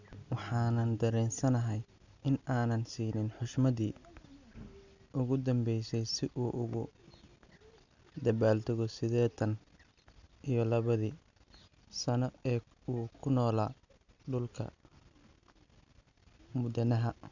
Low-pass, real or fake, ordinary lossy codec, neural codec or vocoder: 7.2 kHz; real; none; none